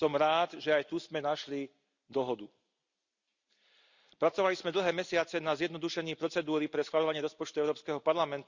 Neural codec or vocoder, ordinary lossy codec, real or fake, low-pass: none; Opus, 64 kbps; real; 7.2 kHz